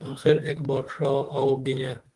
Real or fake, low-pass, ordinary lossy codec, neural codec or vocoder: fake; 10.8 kHz; Opus, 24 kbps; autoencoder, 48 kHz, 32 numbers a frame, DAC-VAE, trained on Japanese speech